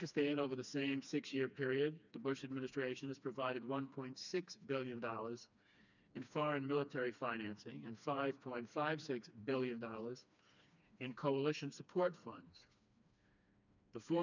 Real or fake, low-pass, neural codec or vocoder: fake; 7.2 kHz; codec, 16 kHz, 2 kbps, FreqCodec, smaller model